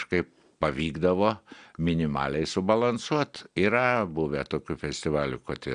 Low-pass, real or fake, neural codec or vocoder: 9.9 kHz; real; none